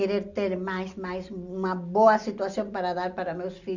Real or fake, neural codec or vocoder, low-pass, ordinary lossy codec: real; none; 7.2 kHz; none